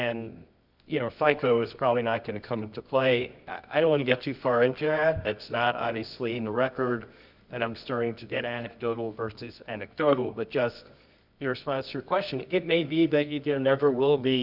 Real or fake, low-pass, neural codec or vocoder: fake; 5.4 kHz; codec, 24 kHz, 0.9 kbps, WavTokenizer, medium music audio release